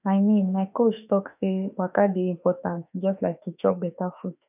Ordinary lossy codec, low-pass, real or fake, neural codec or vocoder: none; 3.6 kHz; fake; autoencoder, 48 kHz, 32 numbers a frame, DAC-VAE, trained on Japanese speech